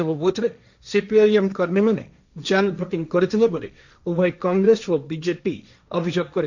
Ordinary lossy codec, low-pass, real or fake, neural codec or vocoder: none; 7.2 kHz; fake; codec, 16 kHz, 1.1 kbps, Voila-Tokenizer